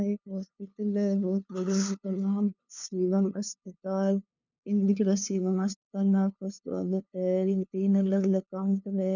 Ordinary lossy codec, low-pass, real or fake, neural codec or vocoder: none; 7.2 kHz; fake; codec, 16 kHz, 2 kbps, FunCodec, trained on LibriTTS, 25 frames a second